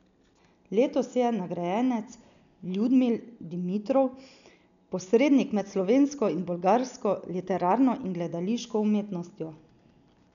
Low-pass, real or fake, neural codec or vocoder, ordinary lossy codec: 7.2 kHz; real; none; none